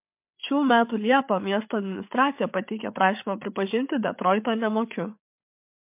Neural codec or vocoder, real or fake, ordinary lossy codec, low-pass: codec, 16 kHz, 8 kbps, FreqCodec, larger model; fake; MP3, 32 kbps; 3.6 kHz